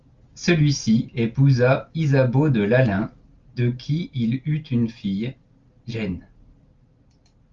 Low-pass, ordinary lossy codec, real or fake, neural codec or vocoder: 7.2 kHz; Opus, 32 kbps; real; none